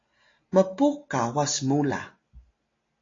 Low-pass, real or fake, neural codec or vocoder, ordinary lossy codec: 7.2 kHz; real; none; AAC, 32 kbps